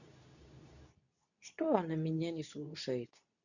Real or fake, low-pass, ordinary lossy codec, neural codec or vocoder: fake; 7.2 kHz; none; codec, 24 kHz, 0.9 kbps, WavTokenizer, medium speech release version 2